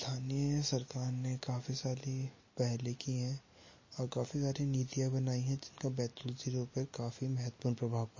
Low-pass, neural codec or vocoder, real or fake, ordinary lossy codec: 7.2 kHz; none; real; MP3, 32 kbps